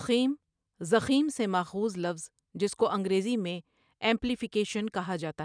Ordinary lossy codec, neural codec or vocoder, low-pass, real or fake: none; none; 9.9 kHz; real